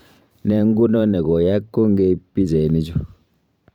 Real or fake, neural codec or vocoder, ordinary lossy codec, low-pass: fake; vocoder, 44.1 kHz, 128 mel bands every 512 samples, BigVGAN v2; none; 19.8 kHz